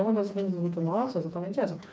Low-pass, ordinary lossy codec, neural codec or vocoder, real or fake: none; none; codec, 16 kHz, 2 kbps, FreqCodec, smaller model; fake